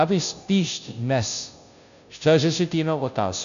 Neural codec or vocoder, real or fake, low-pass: codec, 16 kHz, 0.5 kbps, FunCodec, trained on Chinese and English, 25 frames a second; fake; 7.2 kHz